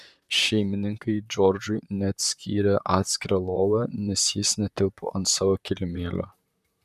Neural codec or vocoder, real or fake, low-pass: vocoder, 44.1 kHz, 128 mel bands, Pupu-Vocoder; fake; 14.4 kHz